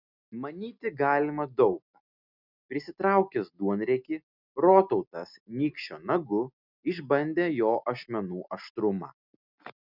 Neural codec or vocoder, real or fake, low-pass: none; real; 5.4 kHz